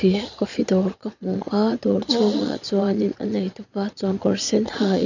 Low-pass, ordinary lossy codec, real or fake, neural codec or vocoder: 7.2 kHz; none; fake; vocoder, 22.05 kHz, 80 mel bands, Vocos